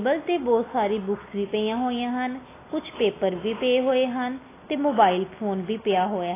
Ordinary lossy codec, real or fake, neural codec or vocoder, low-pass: AAC, 16 kbps; real; none; 3.6 kHz